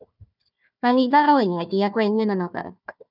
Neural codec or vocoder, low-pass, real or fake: codec, 16 kHz, 1 kbps, FunCodec, trained on Chinese and English, 50 frames a second; 5.4 kHz; fake